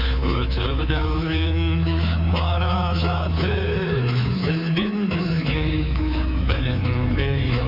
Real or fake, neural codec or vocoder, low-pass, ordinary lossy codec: fake; codec, 24 kHz, 3.1 kbps, DualCodec; 5.4 kHz; AAC, 48 kbps